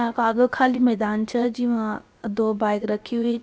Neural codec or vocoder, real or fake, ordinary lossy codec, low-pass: codec, 16 kHz, about 1 kbps, DyCAST, with the encoder's durations; fake; none; none